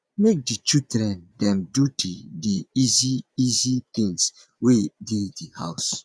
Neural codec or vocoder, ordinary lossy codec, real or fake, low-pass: vocoder, 22.05 kHz, 80 mel bands, Vocos; none; fake; none